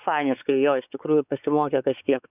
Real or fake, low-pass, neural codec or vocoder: fake; 3.6 kHz; codec, 16 kHz, 4 kbps, X-Codec, WavLM features, trained on Multilingual LibriSpeech